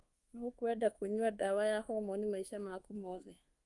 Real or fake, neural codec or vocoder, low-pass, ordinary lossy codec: fake; codec, 24 kHz, 1.2 kbps, DualCodec; 10.8 kHz; Opus, 24 kbps